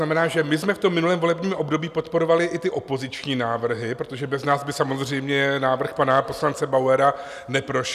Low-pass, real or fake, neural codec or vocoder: 14.4 kHz; real; none